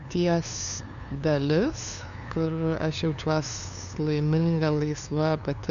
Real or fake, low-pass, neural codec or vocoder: fake; 7.2 kHz; codec, 16 kHz, 2 kbps, FunCodec, trained on LibriTTS, 25 frames a second